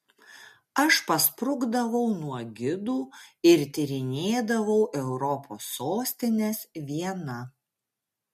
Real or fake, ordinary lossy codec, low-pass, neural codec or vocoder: real; MP3, 64 kbps; 14.4 kHz; none